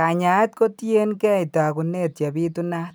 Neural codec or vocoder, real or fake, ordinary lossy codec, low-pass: none; real; none; none